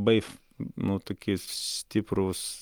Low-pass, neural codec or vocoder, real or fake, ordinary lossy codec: 19.8 kHz; none; real; Opus, 32 kbps